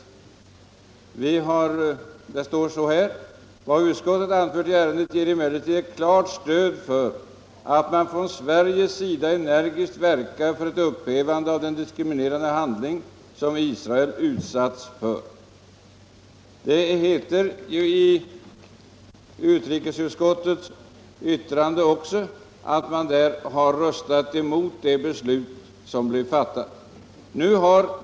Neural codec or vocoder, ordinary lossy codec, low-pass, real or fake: none; none; none; real